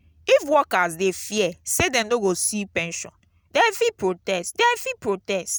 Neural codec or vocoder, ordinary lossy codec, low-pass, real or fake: none; none; none; real